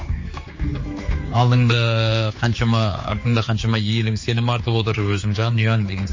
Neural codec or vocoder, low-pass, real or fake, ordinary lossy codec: codec, 16 kHz, 4 kbps, X-Codec, HuBERT features, trained on general audio; 7.2 kHz; fake; MP3, 32 kbps